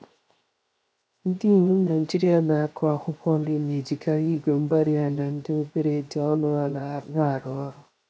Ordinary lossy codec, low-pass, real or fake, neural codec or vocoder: none; none; fake; codec, 16 kHz, 0.7 kbps, FocalCodec